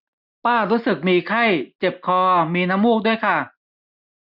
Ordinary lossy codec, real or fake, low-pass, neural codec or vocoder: none; real; 5.4 kHz; none